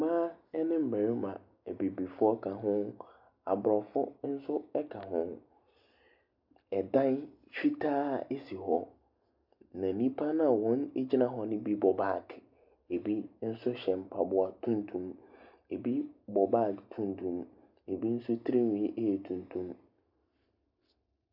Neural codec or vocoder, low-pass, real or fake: none; 5.4 kHz; real